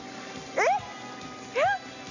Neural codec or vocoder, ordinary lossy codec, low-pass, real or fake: none; none; 7.2 kHz; real